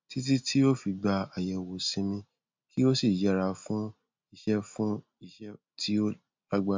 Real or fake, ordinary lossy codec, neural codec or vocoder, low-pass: real; MP3, 64 kbps; none; 7.2 kHz